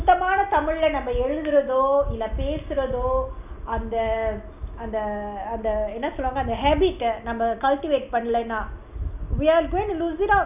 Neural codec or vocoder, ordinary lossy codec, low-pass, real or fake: none; none; 3.6 kHz; real